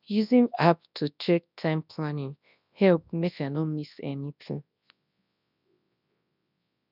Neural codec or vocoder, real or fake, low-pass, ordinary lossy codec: codec, 24 kHz, 0.9 kbps, WavTokenizer, large speech release; fake; 5.4 kHz; none